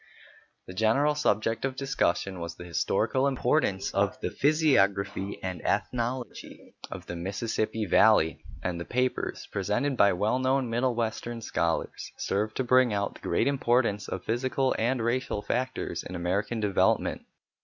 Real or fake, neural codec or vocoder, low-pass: real; none; 7.2 kHz